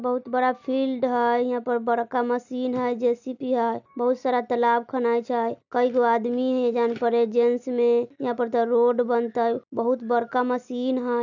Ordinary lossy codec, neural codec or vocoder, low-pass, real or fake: none; none; 7.2 kHz; real